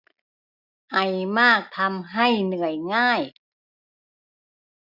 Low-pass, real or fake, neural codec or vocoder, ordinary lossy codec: 5.4 kHz; real; none; none